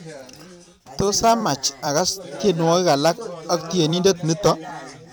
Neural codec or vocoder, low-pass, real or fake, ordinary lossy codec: vocoder, 44.1 kHz, 128 mel bands every 256 samples, BigVGAN v2; none; fake; none